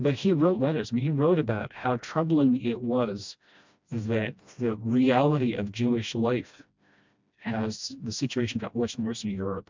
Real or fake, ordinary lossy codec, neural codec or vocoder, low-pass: fake; MP3, 64 kbps; codec, 16 kHz, 1 kbps, FreqCodec, smaller model; 7.2 kHz